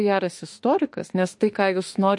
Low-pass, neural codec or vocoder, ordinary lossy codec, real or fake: 10.8 kHz; autoencoder, 48 kHz, 32 numbers a frame, DAC-VAE, trained on Japanese speech; MP3, 48 kbps; fake